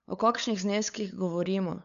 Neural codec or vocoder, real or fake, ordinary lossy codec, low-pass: codec, 16 kHz, 8 kbps, FunCodec, trained on LibriTTS, 25 frames a second; fake; none; 7.2 kHz